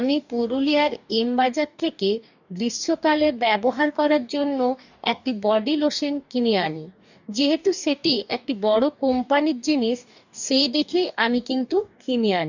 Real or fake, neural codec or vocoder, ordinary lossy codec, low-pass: fake; codec, 44.1 kHz, 2.6 kbps, DAC; none; 7.2 kHz